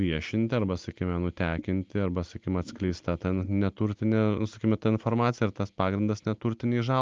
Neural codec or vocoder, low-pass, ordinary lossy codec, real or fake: none; 7.2 kHz; Opus, 32 kbps; real